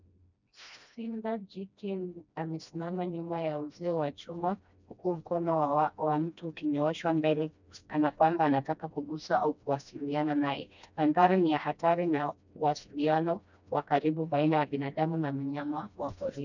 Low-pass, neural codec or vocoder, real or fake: 7.2 kHz; codec, 16 kHz, 1 kbps, FreqCodec, smaller model; fake